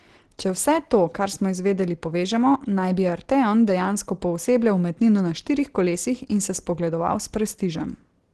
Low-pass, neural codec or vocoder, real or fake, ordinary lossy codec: 10.8 kHz; none; real; Opus, 16 kbps